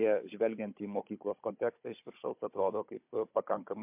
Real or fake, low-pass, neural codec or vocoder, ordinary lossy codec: fake; 3.6 kHz; codec, 16 kHz, 16 kbps, FunCodec, trained on Chinese and English, 50 frames a second; MP3, 32 kbps